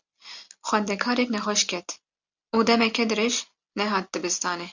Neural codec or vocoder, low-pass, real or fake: none; 7.2 kHz; real